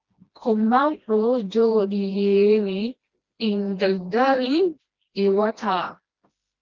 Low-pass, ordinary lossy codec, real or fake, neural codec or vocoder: 7.2 kHz; Opus, 32 kbps; fake; codec, 16 kHz, 1 kbps, FreqCodec, smaller model